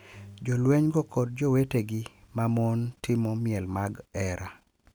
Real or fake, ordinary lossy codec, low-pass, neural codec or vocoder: real; none; none; none